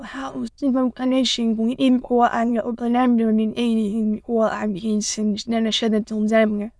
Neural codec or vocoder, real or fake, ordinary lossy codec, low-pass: autoencoder, 22.05 kHz, a latent of 192 numbers a frame, VITS, trained on many speakers; fake; none; none